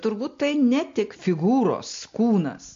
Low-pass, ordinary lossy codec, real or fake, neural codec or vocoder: 7.2 kHz; MP3, 48 kbps; real; none